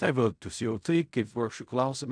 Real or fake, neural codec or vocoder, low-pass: fake; codec, 16 kHz in and 24 kHz out, 0.4 kbps, LongCat-Audio-Codec, fine tuned four codebook decoder; 9.9 kHz